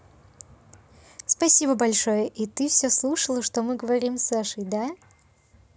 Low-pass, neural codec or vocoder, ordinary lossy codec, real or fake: none; none; none; real